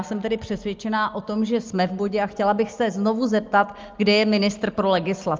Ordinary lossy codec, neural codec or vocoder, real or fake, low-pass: Opus, 32 kbps; none; real; 7.2 kHz